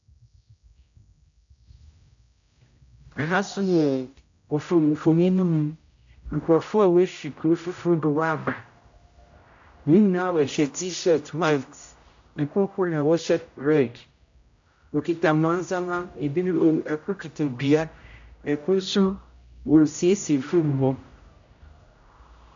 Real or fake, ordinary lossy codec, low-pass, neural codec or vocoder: fake; AAC, 64 kbps; 7.2 kHz; codec, 16 kHz, 0.5 kbps, X-Codec, HuBERT features, trained on general audio